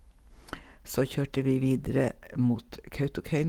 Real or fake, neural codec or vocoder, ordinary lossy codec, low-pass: fake; codec, 44.1 kHz, 7.8 kbps, Pupu-Codec; Opus, 24 kbps; 19.8 kHz